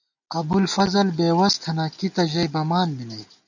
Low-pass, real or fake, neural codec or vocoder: 7.2 kHz; real; none